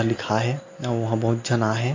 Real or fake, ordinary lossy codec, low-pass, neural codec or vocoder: real; AAC, 48 kbps; 7.2 kHz; none